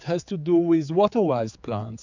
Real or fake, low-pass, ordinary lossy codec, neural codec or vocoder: fake; 7.2 kHz; MP3, 64 kbps; codec, 16 kHz, 4 kbps, X-Codec, HuBERT features, trained on general audio